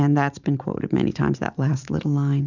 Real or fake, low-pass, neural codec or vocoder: fake; 7.2 kHz; vocoder, 44.1 kHz, 128 mel bands every 512 samples, BigVGAN v2